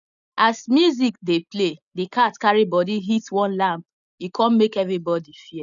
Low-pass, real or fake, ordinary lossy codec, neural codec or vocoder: 7.2 kHz; real; none; none